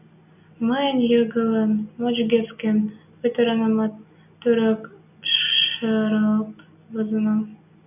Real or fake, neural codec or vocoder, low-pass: real; none; 3.6 kHz